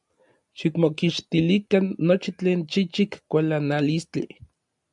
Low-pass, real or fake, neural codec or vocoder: 10.8 kHz; real; none